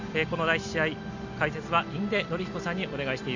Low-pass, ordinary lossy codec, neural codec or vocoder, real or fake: 7.2 kHz; Opus, 64 kbps; none; real